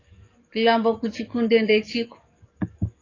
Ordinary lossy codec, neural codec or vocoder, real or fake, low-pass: AAC, 32 kbps; codec, 44.1 kHz, 7.8 kbps, DAC; fake; 7.2 kHz